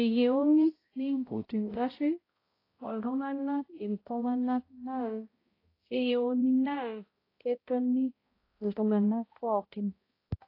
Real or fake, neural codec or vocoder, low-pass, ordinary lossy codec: fake; codec, 16 kHz, 0.5 kbps, X-Codec, HuBERT features, trained on balanced general audio; 5.4 kHz; AAC, 24 kbps